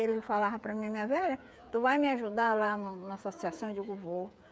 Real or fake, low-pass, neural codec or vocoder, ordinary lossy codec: fake; none; codec, 16 kHz, 8 kbps, FreqCodec, smaller model; none